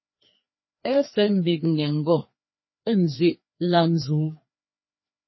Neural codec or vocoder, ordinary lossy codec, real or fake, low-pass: codec, 16 kHz, 2 kbps, FreqCodec, larger model; MP3, 24 kbps; fake; 7.2 kHz